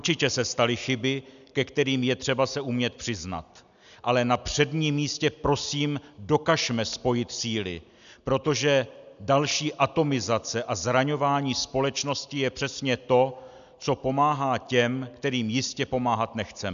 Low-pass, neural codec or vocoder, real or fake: 7.2 kHz; none; real